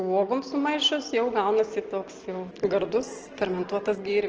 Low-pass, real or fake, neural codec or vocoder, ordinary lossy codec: 7.2 kHz; real; none; Opus, 16 kbps